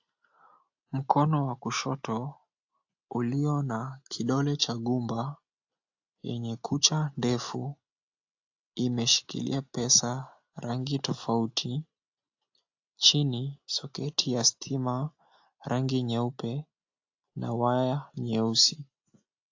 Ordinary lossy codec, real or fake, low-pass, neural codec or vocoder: AAC, 48 kbps; real; 7.2 kHz; none